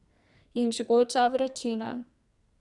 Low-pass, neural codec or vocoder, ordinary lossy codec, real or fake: 10.8 kHz; codec, 44.1 kHz, 2.6 kbps, SNAC; none; fake